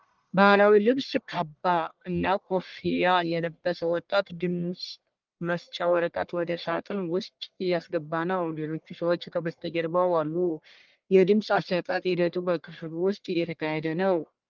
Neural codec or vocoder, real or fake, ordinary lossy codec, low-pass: codec, 44.1 kHz, 1.7 kbps, Pupu-Codec; fake; Opus, 32 kbps; 7.2 kHz